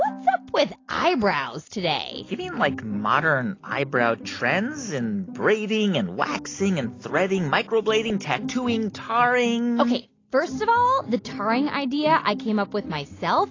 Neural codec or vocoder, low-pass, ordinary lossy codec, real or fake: none; 7.2 kHz; AAC, 32 kbps; real